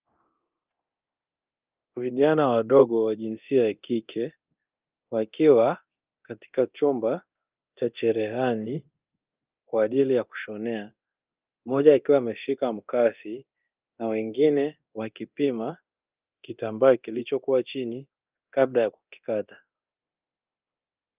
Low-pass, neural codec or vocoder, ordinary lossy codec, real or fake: 3.6 kHz; codec, 24 kHz, 0.9 kbps, DualCodec; Opus, 24 kbps; fake